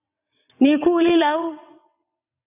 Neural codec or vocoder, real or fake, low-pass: vocoder, 22.05 kHz, 80 mel bands, WaveNeXt; fake; 3.6 kHz